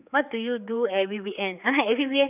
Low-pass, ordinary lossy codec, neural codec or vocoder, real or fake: 3.6 kHz; none; codec, 16 kHz, 4 kbps, X-Codec, HuBERT features, trained on general audio; fake